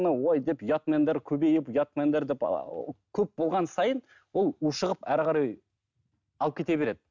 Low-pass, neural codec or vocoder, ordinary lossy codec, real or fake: 7.2 kHz; none; none; real